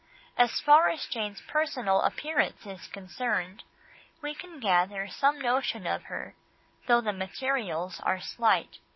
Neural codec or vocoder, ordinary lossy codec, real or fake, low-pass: none; MP3, 24 kbps; real; 7.2 kHz